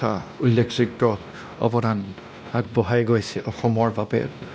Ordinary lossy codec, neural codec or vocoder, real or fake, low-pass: none; codec, 16 kHz, 1 kbps, X-Codec, WavLM features, trained on Multilingual LibriSpeech; fake; none